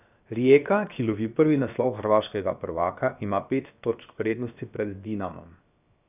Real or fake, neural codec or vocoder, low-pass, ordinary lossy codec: fake; codec, 16 kHz, 0.7 kbps, FocalCodec; 3.6 kHz; none